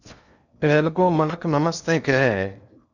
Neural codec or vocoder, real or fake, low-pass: codec, 16 kHz in and 24 kHz out, 0.8 kbps, FocalCodec, streaming, 65536 codes; fake; 7.2 kHz